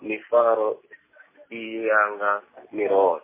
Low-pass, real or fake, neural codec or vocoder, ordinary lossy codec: 3.6 kHz; real; none; MP3, 16 kbps